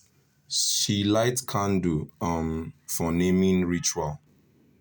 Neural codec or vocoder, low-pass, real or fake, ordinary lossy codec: none; none; real; none